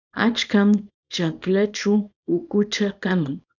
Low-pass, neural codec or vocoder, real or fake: 7.2 kHz; codec, 24 kHz, 0.9 kbps, WavTokenizer, small release; fake